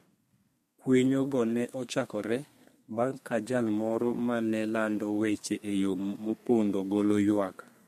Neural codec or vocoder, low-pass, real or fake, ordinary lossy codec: codec, 32 kHz, 1.9 kbps, SNAC; 14.4 kHz; fake; MP3, 64 kbps